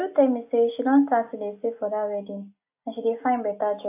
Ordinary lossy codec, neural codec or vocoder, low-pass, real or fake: AAC, 24 kbps; none; 3.6 kHz; real